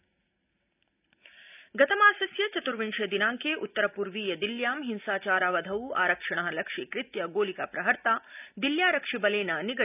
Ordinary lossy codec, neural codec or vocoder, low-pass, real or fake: none; none; 3.6 kHz; real